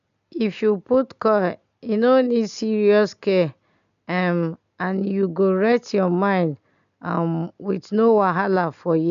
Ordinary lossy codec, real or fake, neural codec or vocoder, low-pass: none; real; none; 7.2 kHz